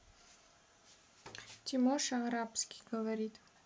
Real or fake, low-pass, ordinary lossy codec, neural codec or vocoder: real; none; none; none